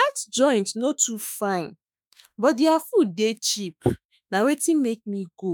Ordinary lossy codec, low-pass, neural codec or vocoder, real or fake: none; none; autoencoder, 48 kHz, 32 numbers a frame, DAC-VAE, trained on Japanese speech; fake